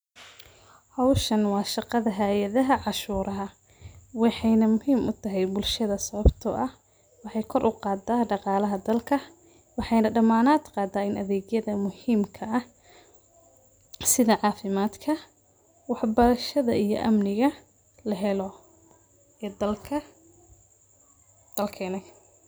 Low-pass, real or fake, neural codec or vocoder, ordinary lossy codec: none; real; none; none